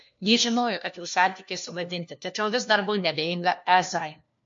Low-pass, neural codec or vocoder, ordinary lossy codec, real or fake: 7.2 kHz; codec, 16 kHz, 1 kbps, FunCodec, trained on LibriTTS, 50 frames a second; MP3, 48 kbps; fake